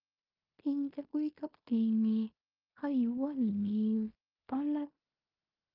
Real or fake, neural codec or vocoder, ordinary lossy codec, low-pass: fake; codec, 16 kHz in and 24 kHz out, 0.9 kbps, LongCat-Audio-Codec, fine tuned four codebook decoder; Opus, 32 kbps; 5.4 kHz